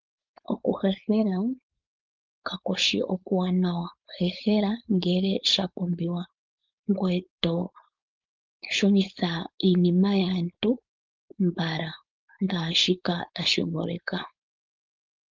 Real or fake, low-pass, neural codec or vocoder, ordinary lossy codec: fake; 7.2 kHz; codec, 16 kHz, 4.8 kbps, FACodec; Opus, 24 kbps